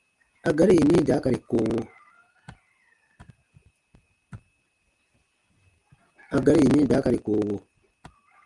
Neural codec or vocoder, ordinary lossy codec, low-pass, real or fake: none; Opus, 32 kbps; 10.8 kHz; real